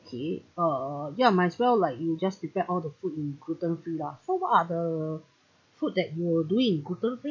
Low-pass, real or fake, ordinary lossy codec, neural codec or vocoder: 7.2 kHz; real; MP3, 64 kbps; none